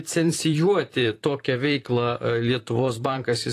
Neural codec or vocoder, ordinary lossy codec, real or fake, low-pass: vocoder, 44.1 kHz, 128 mel bands, Pupu-Vocoder; AAC, 48 kbps; fake; 14.4 kHz